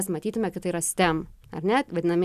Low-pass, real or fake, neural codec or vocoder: 14.4 kHz; real; none